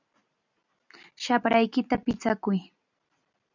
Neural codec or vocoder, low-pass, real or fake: none; 7.2 kHz; real